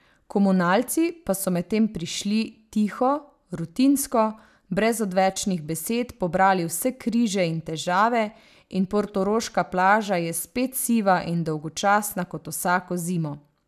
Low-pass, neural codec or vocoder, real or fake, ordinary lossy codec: 14.4 kHz; none; real; none